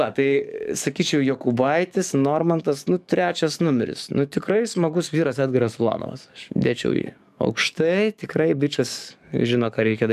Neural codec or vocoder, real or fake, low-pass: codec, 44.1 kHz, 7.8 kbps, DAC; fake; 14.4 kHz